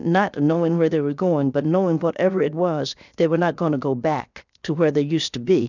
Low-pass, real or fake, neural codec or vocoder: 7.2 kHz; fake; codec, 16 kHz, 0.7 kbps, FocalCodec